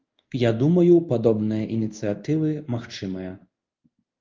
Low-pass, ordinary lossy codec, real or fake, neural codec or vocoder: 7.2 kHz; Opus, 24 kbps; fake; codec, 16 kHz in and 24 kHz out, 1 kbps, XY-Tokenizer